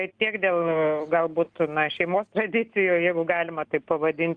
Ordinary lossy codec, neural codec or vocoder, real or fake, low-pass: Opus, 24 kbps; none; real; 9.9 kHz